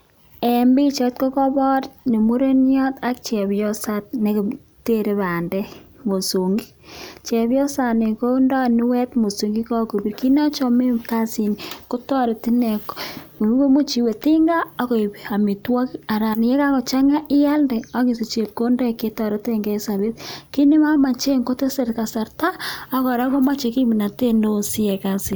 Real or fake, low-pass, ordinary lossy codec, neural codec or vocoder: real; none; none; none